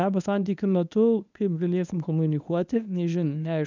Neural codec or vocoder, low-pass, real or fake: codec, 24 kHz, 0.9 kbps, WavTokenizer, medium speech release version 1; 7.2 kHz; fake